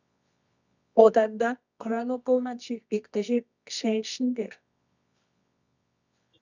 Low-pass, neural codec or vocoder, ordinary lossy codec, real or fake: 7.2 kHz; codec, 24 kHz, 0.9 kbps, WavTokenizer, medium music audio release; none; fake